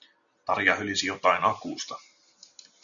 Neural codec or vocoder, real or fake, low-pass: none; real; 7.2 kHz